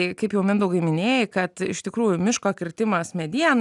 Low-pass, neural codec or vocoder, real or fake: 10.8 kHz; none; real